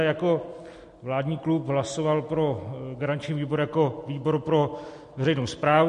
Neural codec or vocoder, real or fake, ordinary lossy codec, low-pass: none; real; MP3, 48 kbps; 14.4 kHz